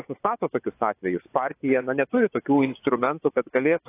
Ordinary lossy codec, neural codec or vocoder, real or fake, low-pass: AAC, 24 kbps; vocoder, 24 kHz, 100 mel bands, Vocos; fake; 3.6 kHz